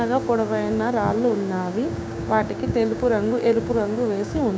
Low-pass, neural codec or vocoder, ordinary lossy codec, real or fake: none; codec, 16 kHz, 6 kbps, DAC; none; fake